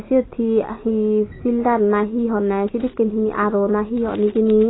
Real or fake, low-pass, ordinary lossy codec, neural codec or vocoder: real; 7.2 kHz; AAC, 16 kbps; none